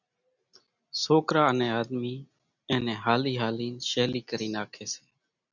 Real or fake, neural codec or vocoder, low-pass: real; none; 7.2 kHz